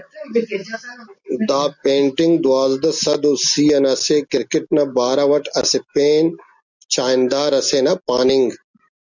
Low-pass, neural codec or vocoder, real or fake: 7.2 kHz; none; real